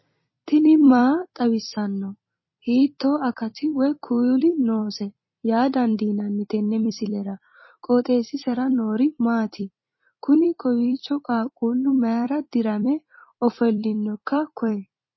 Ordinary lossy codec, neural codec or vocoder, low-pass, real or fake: MP3, 24 kbps; vocoder, 44.1 kHz, 128 mel bands every 256 samples, BigVGAN v2; 7.2 kHz; fake